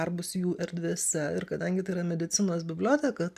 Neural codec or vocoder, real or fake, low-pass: none; real; 14.4 kHz